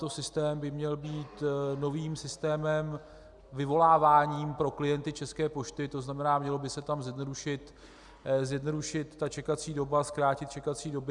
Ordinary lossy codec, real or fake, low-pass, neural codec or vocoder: Opus, 64 kbps; real; 10.8 kHz; none